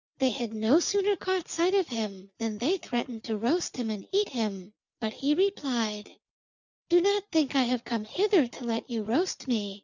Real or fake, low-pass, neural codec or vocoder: real; 7.2 kHz; none